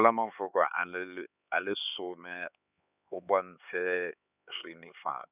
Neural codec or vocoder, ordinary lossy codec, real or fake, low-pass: codec, 16 kHz, 4 kbps, X-Codec, HuBERT features, trained on LibriSpeech; none; fake; 3.6 kHz